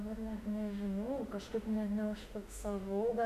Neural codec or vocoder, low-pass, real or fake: autoencoder, 48 kHz, 32 numbers a frame, DAC-VAE, trained on Japanese speech; 14.4 kHz; fake